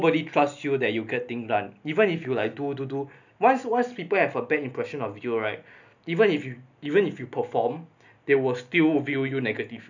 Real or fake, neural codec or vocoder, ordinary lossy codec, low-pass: real; none; none; 7.2 kHz